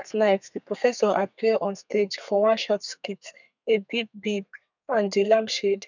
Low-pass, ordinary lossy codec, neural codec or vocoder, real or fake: 7.2 kHz; none; codec, 32 kHz, 1.9 kbps, SNAC; fake